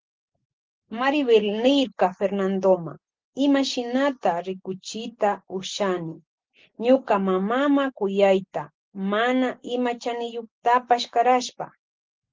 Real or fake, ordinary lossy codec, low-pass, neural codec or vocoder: real; Opus, 16 kbps; 7.2 kHz; none